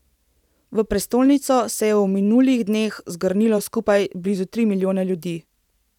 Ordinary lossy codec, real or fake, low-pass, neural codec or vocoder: none; fake; 19.8 kHz; vocoder, 44.1 kHz, 128 mel bands every 512 samples, BigVGAN v2